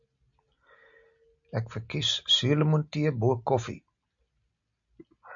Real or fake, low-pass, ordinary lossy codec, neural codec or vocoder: real; 7.2 kHz; AAC, 64 kbps; none